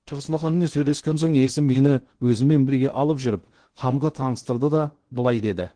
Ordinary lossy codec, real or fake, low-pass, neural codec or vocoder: Opus, 16 kbps; fake; 9.9 kHz; codec, 16 kHz in and 24 kHz out, 0.6 kbps, FocalCodec, streaming, 2048 codes